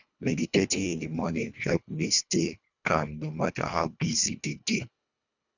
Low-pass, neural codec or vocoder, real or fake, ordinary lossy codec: 7.2 kHz; codec, 24 kHz, 1.5 kbps, HILCodec; fake; none